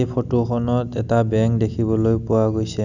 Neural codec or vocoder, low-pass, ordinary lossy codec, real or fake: none; 7.2 kHz; none; real